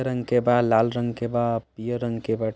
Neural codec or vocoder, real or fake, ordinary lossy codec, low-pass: none; real; none; none